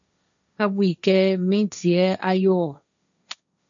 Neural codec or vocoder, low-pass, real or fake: codec, 16 kHz, 1.1 kbps, Voila-Tokenizer; 7.2 kHz; fake